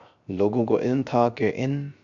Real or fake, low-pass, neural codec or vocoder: fake; 7.2 kHz; codec, 16 kHz, 0.3 kbps, FocalCodec